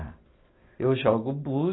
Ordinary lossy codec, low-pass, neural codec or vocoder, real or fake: AAC, 16 kbps; 7.2 kHz; none; real